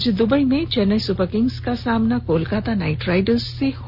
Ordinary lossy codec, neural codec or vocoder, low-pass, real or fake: none; none; 5.4 kHz; real